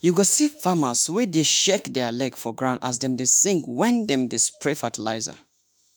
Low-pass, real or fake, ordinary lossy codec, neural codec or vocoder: none; fake; none; autoencoder, 48 kHz, 32 numbers a frame, DAC-VAE, trained on Japanese speech